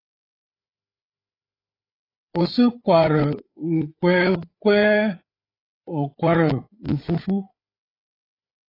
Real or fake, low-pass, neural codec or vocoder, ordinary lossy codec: fake; 5.4 kHz; codec, 16 kHz, 8 kbps, FreqCodec, larger model; AAC, 24 kbps